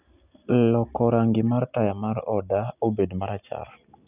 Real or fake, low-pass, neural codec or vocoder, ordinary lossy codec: real; 3.6 kHz; none; none